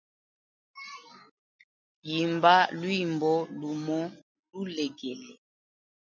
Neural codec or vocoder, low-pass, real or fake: none; 7.2 kHz; real